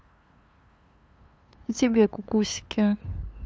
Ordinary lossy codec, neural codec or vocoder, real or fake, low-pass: none; codec, 16 kHz, 2 kbps, FunCodec, trained on LibriTTS, 25 frames a second; fake; none